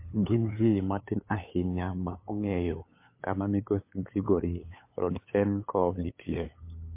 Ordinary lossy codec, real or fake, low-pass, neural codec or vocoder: MP3, 24 kbps; fake; 3.6 kHz; codec, 16 kHz, 8 kbps, FunCodec, trained on LibriTTS, 25 frames a second